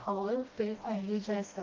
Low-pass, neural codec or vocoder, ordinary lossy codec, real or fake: 7.2 kHz; codec, 16 kHz, 1 kbps, FreqCodec, smaller model; Opus, 24 kbps; fake